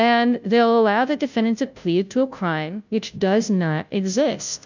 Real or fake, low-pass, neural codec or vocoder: fake; 7.2 kHz; codec, 16 kHz, 0.5 kbps, FunCodec, trained on Chinese and English, 25 frames a second